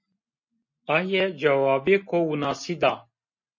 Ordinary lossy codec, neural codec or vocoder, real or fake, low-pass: MP3, 32 kbps; none; real; 7.2 kHz